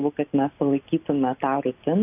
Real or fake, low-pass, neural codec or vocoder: real; 3.6 kHz; none